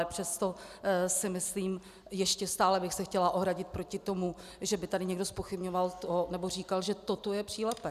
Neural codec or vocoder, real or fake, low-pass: none; real; 14.4 kHz